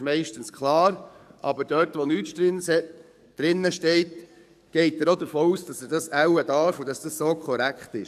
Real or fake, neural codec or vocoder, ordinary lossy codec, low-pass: fake; codec, 44.1 kHz, 7.8 kbps, DAC; none; 14.4 kHz